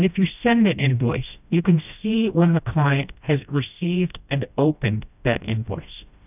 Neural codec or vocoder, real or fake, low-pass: codec, 16 kHz, 1 kbps, FreqCodec, smaller model; fake; 3.6 kHz